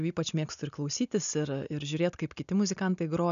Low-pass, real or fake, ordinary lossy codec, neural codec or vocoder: 7.2 kHz; real; MP3, 96 kbps; none